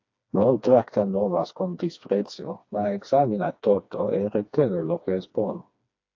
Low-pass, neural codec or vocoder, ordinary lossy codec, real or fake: 7.2 kHz; codec, 16 kHz, 2 kbps, FreqCodec, smaller model; AAC, 48 kbps; fake